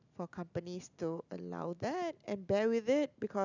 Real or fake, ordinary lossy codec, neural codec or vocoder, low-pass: real; none; none; 7.2 kHz